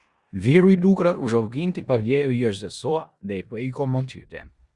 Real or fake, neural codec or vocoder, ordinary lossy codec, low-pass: fake; codec, 16 kHz in and 24 kHz out, 0.9 kbps, LongCat-Audio-Codec, four codebook decoder; Opus, 64 kbps; 10.8 kHz